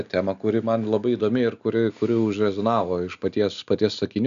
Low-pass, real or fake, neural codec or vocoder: 7.2 kHz; real; none